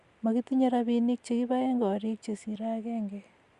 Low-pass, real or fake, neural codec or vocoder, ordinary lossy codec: 10.8 kHz; real; none; Opus, 64 kbps